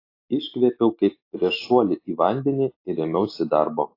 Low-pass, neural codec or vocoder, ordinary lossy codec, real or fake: 5.4 kHz; none; AAC, 32 kbps; real